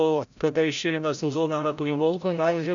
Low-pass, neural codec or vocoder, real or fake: 7.2 kHz; codec, 16 kHz, 0.5 kbps, FreqCodec, larger model; fake